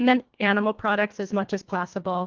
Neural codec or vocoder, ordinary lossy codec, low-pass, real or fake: codec, 24 kHz, 1.5 kbps, HILCodec; Opus, 32 kbps; 7.2 kHz; fake